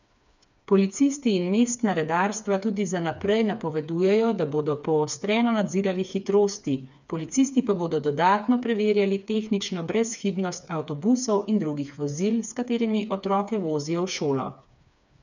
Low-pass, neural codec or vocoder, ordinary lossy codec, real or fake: 7.2 kHz; codec, 16 kHz, 4 kbps, FreqCodec, smaller model; none; fake